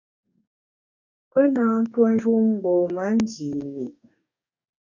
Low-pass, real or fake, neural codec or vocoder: 7.2 kHz; fake; codec, 44.1 kHz, 2.6 kbps, DAC